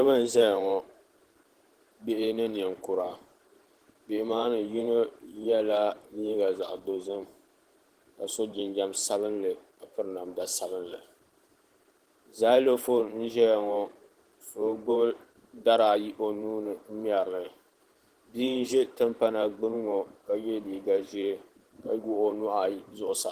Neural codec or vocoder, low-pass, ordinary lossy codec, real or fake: vocoder, 44.1 kHz, 128 mel bands every 512 samples, BigVGAN v2; 14.4 kHz; Opus, 16 kbps; fake